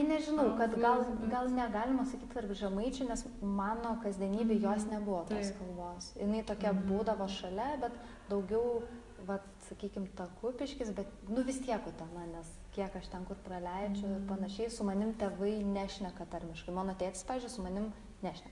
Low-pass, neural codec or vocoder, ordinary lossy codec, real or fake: 10.8 kHz; none; Opus, 64 kbps; real